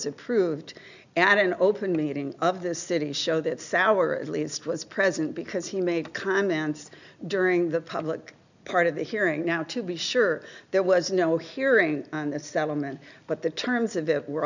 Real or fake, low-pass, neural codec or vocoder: real; 7.2 kHz; none